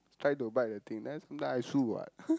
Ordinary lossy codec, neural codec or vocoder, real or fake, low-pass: none; none; real; none